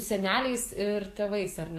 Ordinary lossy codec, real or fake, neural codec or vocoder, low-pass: AAC, 64 kbps; fake; codec, 44.1 kHz, 7.8 kbps, DAC; 14.4 kHz